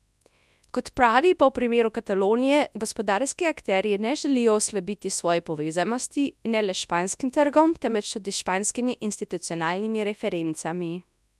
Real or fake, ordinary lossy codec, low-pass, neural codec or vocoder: fake; none; none; codec, 24 kHz, 0.9 kbps, WavTokenizer, large speech release